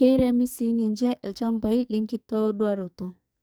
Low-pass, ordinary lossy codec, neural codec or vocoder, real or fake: none; none; codec, 44.1 kHz, 2.6 kbps, SNAC; fake